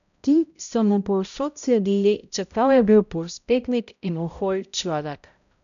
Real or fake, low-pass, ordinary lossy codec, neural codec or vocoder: fake; 7.2 kHz; none; codec, 16 kHz, 0.5 kbps, X-Codec, HuBERT features, trained on balanced general audio